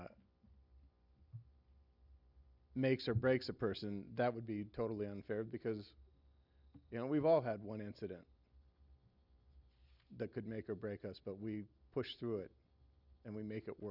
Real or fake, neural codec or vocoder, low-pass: real; none; 5.4 kHz